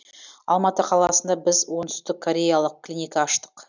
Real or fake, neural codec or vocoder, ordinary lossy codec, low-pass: real; none; none; 7.2 kHz